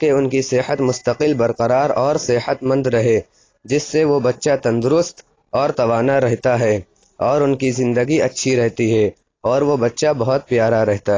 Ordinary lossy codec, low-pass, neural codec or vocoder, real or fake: AAC, 32 kbps; 7.2 kHz; none; real